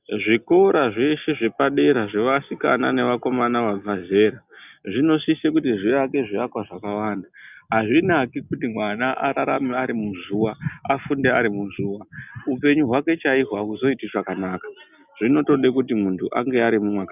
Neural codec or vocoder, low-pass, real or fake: none; 3.6 kHz; real